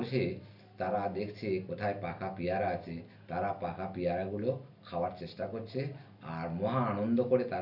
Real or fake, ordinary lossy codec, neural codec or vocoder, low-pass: real; none; none; 5.4 kHz